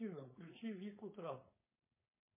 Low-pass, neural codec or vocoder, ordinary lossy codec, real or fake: 3.6 kHz; codec, 16 kHz, 4.8 kbps, FACodec; MP3, 16 kbps; fake